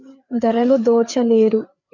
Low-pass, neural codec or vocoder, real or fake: 7.2 kHz; codec, 16 kHz, 4 kbps, FreqCodec, larger model; fake